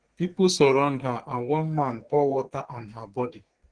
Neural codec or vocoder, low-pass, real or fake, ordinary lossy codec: codec, 32 kHz, 1.9 kbps, SNAC; 9.9 kHz; fake; Opus, 16 kbps